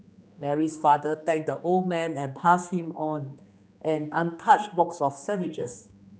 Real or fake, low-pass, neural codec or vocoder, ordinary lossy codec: fake; none; codec, 16 kHz, 2 kbps, X-Codec, HuBERT features, trained on general audio; none